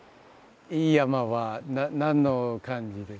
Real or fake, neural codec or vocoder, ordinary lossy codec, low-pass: real; none; none; none